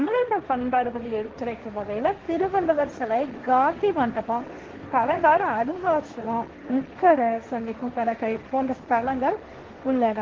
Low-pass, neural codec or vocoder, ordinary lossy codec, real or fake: 7.2 kHz; codec, 16 kHz, 1.1 kbps, Voila-Tokenizer; Opus, 16 kbps; fake